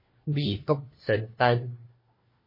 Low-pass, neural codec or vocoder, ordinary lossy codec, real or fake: 5.4 kHz; codec, 16 kHz, 1 kbps, FunCodec, trained on LibriTTS, 50 frames a second; MP3, 24 kbps; fake